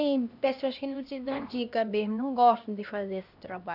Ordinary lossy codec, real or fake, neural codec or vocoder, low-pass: none; fake; codec, 16 kHz, 1 kbps, X-Codec, HuBERT features, trained on LibriSpeech; 5.4 kHz